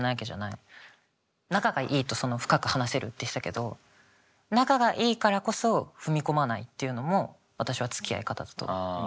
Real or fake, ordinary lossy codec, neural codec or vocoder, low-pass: real; none; none; none